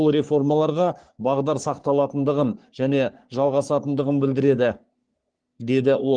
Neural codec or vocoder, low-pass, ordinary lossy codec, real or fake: codec, 44.1 kHz, 3.4 kbps, Pupu-Codec; 9.9 kHz; Opus, 24 kbps; fake